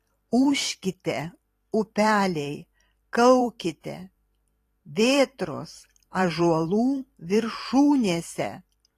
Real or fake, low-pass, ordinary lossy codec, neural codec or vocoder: fake; 14.4 kHz; AAC, 48 kbps; vocoder, 44.1 kHz, 128 mel bands every 512 samples, BigVGAN v2